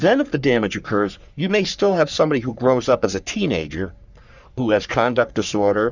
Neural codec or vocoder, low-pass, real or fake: codec, 44.1 kHz, 3.4 kbps, Pupu-Codec; 7.2 kHz; fake